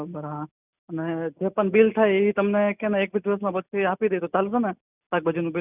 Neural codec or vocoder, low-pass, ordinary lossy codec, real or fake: none; 3.6 kHz; none; real